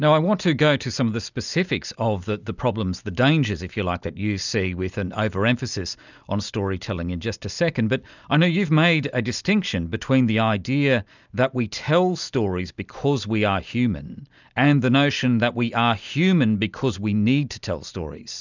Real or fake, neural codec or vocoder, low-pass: real; none; 7.2 kHz